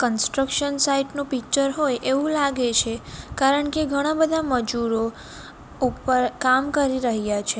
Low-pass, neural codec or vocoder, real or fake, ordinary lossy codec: none; none; real; none